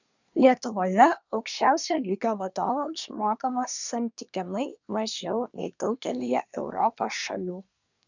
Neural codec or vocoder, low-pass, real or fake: codec, 24 kHz, 1 kbps, SNAC; 7.2 kHz; fake